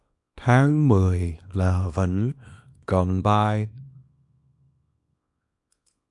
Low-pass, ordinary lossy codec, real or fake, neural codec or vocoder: 10.8 kHz; AAC, 64 kbps; fake; codec, 24 kHz, 0.9 kbps, WavTokenizer, small release